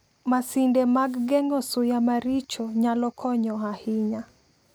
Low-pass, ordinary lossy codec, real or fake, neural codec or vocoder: none; none; real; none